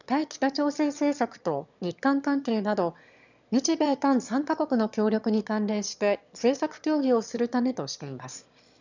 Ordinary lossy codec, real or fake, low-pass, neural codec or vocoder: none; fake; 7.2 kHz; autoencoder, 22.05 kHz, a latent of 192 numbers a frame, VITS, trained on one speaker